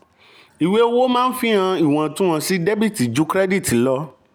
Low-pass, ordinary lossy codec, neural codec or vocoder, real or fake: none; none; none; real